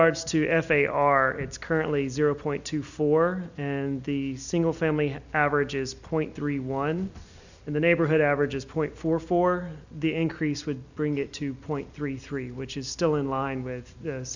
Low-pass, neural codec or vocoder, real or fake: 7.2 kHz; none; real